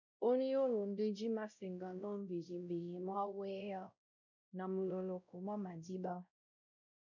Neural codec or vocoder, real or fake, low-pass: codec, 16 kHz, 0.5 kbps, X-Codec, WavLM features, trained on Multilingual LibriSpeech; fake; 7.2 kHz